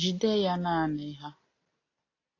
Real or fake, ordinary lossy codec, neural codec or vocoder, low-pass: real; AAC, 32 kbps; none; 7.2 kHz